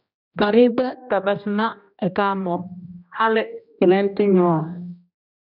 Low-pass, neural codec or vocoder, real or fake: 5.4 kHz; codec, 16 kHz, 1 kbps, X-Codec, HuBERT features, trained on general audio; fake